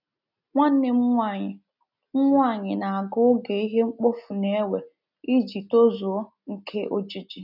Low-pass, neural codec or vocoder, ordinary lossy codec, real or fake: 5.4 kHz; none; none; real